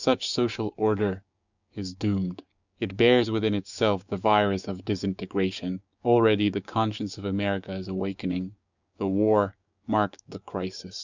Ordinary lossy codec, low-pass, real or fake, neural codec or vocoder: Opus, 64 kbps; 7.2 kHz; fake; codec, 16 kHz, 6 kbps, DAC